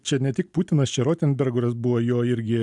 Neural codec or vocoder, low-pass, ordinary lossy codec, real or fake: none; 10.8 kHz; MP3, 96 kbps; real